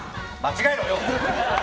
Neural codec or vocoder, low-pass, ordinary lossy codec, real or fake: none; none; none; real